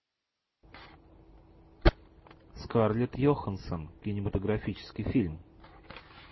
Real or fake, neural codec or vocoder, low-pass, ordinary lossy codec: real; none; 7.2 kHz; MP3, 24 kbps